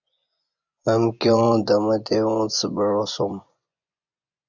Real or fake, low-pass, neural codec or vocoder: fake; 7.2 kHz; vocoder, 24 kHz, 100 mel bands, Vocos